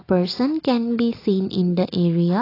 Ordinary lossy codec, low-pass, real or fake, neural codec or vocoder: AAC, 24 kbps; 5.4 kHz; real; none